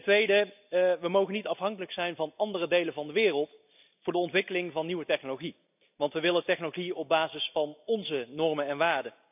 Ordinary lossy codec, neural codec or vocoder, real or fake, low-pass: none; none; real; 3.6 kHz